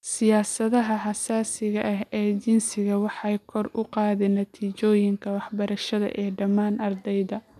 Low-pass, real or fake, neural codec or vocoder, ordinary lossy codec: 14.4 kHz; real; none; none